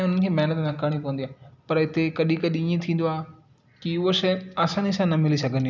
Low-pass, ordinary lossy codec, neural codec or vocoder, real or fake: 7.2 kHz; none; none; real